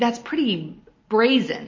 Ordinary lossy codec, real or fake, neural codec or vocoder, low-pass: MP3, 32 kbps; real; none; 7.2 kHz